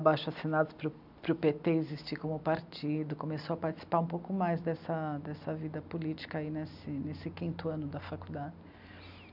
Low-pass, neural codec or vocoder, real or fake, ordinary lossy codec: 5.4 kHz; none; real; none